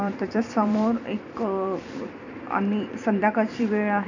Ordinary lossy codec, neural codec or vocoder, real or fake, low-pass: none; vocoder, 44.1 kHz, 128 mel bands every 256 samples, BigVGAN v2; fake; 7.2 kHz